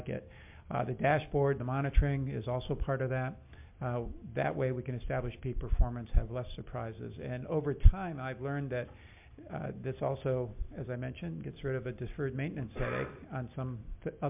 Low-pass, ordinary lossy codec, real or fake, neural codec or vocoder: 3.6 kHz; MP3, 32 kbps; real; none